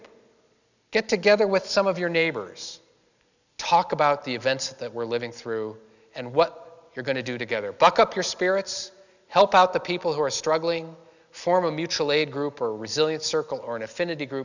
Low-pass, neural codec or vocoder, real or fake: 7.2 kHz; none; real